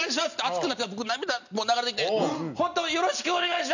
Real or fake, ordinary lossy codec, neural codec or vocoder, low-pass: real; none; none; 7.2 kHz